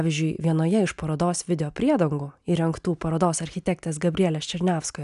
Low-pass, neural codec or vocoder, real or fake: 10.8 kHz; none; real